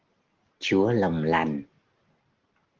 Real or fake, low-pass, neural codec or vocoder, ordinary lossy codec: real; 7.2 kHz; none; Opus, 16 kbps